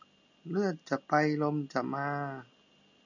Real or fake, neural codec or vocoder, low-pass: real; none; 7.2 kHz